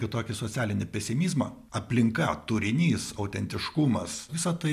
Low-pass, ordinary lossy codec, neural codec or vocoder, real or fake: 14.4 kHz; MP3, 96 kbps; none; real